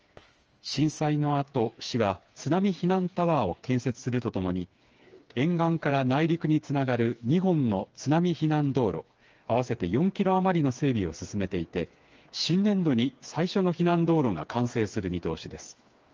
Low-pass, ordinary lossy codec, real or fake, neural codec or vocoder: 7.2 kHz; Opus, 24 kbps; fake; codec, 16 kHz, 4 kbps, FreqCodec, smaller model